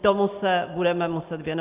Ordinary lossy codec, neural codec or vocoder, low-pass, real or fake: Opus, 24 kbps; none; 3.6 kHz; real